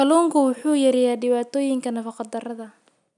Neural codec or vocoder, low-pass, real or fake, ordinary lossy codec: none; 10.8 kHz; real; none